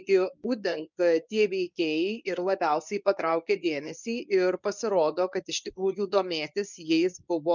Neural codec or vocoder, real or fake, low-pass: codec, 24 kHz, 0.9 kbps, WavTokenizer, medium speech release version 1; fake; 7.2 kHz